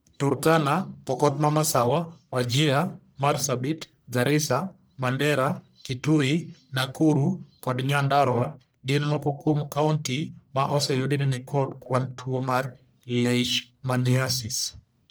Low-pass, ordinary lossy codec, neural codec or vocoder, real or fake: none; none; codec, 44.1 kHz, 1.7 kbps, Pupu-Codec; fake